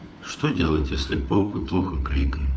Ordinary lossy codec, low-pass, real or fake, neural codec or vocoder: none; none; fake; codec, 16 kHz, 4 kbps, FunCodec, trained on LibriTTS, 50 frames a second